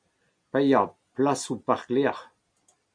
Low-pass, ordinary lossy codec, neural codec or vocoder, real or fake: 9.9 kHz; MP3, 96 kbps; none; real